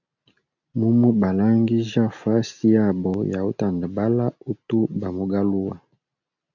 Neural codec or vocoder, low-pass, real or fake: none; 7.2 kHz; real